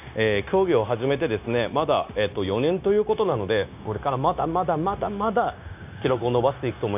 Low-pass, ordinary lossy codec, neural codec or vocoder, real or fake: 3.6 kHz; AAC, 32 kbps; codec, 16 kHz, 0.9 kbps, LongCat-Audio-Codec; fake